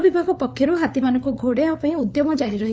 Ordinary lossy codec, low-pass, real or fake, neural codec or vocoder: none; none; fake; codec, 16 kHz, 4 kbps, FunCodec, trained on LibriTTS, 50 frames a second